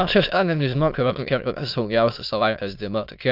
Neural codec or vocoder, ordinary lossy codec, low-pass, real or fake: autoencoder, 22.05 kHz, a latent of 192 numbers a frame, VITS, trained on many speakers; MP3, 48 kbps; 5.4 kHz; fake